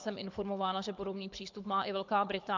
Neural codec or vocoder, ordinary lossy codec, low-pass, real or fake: codec, 24 kHz, 6 kbps, HILCodec; MP3, 64 kbps; 7.2 kHz; fake